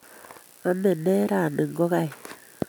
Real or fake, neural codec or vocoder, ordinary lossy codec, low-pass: real; none; none; none